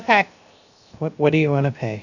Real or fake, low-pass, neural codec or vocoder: fake; 7.2 kHz; codec, 16 kHz, 0.7 kbps, FocalCodec